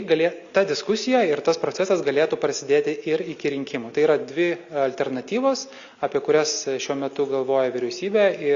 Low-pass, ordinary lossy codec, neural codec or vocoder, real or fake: 7.2 kHz; Opus, 64 kbps; none; real